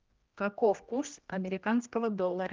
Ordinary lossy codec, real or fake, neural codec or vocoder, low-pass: Opus, 32 kbps; fake; codec, 16 kHz, 1 kbps, X-Codec, HuBERT features, trained on general audio; 7.2 kHz